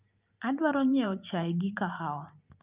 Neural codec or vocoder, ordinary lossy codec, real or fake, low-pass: vocoder, 44.1 kHz, 128 mel bands every 512 samples, BigVGAN v2; Opus, 24 kbps; fake; 3.6 kHz